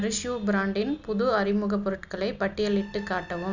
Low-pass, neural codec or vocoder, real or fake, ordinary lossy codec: 7.2 kHz; none; real; none